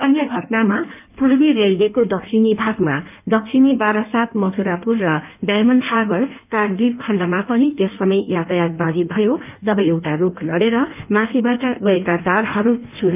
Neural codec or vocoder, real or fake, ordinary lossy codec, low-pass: codec, 16 kHz in and 24 kHz out, 1.1 kbps, FireRedTTS-2 codec; fake; none; 3.6 kHz